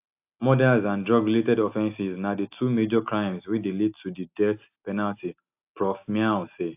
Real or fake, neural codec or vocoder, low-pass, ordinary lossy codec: real; none; 3.6 kHz; AAC, 32 kbps